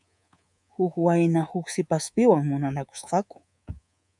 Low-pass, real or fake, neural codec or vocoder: 10.8 kHz; fake; codec, 24 kHz, 3.1 kbps, DualCodec